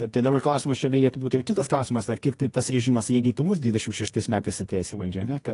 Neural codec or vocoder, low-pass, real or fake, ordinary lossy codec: codec, 24 kHz, 0.9 kbps, WavTokenizer, medium music audio release; 10.8 kHz; fake; AAC, 48 kbps